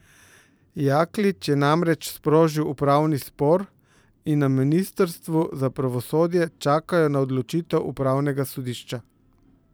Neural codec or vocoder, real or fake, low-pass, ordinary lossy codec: vocoder, 44.1 kHz, 128 mel bands every 512 samples, BigVGAN v2; fake; none; none